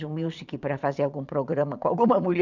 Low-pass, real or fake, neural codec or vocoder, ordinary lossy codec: 7.2 kHz; fake; vocoder, 22.05 kHz, 80 mel bands, Vocos; none